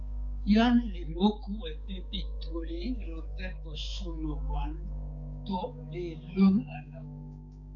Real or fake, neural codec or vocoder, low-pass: fake; codec, 16 kHz, 4 kbps, X-Codec, HuBERT features, trained on balanced general audio; 7.2 kHz